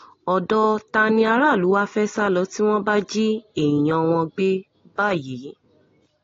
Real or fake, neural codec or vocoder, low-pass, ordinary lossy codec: real; none; 7.2 kHz; AAC, 32 kbps